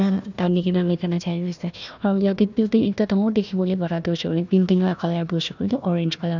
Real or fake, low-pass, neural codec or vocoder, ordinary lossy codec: fake; 7.2 kHz; codec, 16 kHz, 1 kbps, FunCodec, trained on Chinese and English, 50 frames a second; none